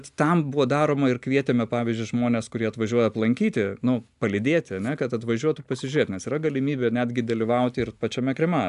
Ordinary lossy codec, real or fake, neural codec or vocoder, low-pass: AAC, 96 kbps; real; none; 10.8 kHz